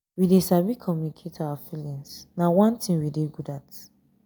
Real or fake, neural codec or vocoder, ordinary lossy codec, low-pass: real; none; none; none